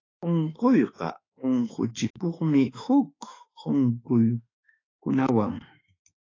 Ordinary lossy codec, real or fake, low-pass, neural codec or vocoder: AAC, 32 kbps; fake; 7.2 kHz; autoencoder, 48 kHz, 32 numbers a frame, DAC-VAE, trained on Japanese speech